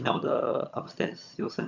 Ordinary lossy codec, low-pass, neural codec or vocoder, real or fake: none; 7.2 kHz; vocoder, 22.05 kHz, 80 mel bands, HiFi-GAN; fake